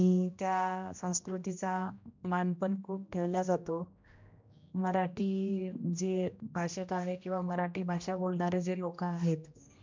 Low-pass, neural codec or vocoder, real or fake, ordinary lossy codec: 7.2 kHz; codec, 16 kHz, 1 kbps, X-Codec, HuBERT features, trained on general audio; fake; MP3, 64 kbps